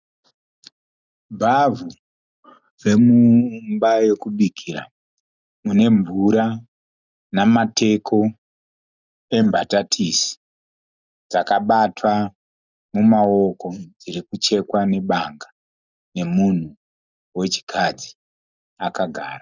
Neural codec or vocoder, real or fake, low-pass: none; real; 7.2 kHz